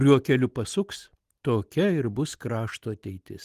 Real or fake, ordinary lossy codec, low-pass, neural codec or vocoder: real; Opus, 24 kbps; 14.4 kHz; none